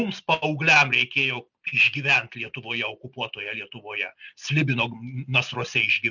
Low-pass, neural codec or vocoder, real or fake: 7.2 kHz; none; real